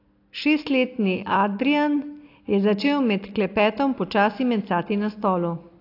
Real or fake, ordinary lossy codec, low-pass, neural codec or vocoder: real; AAC, 32 kbps; 5.4 kHz; none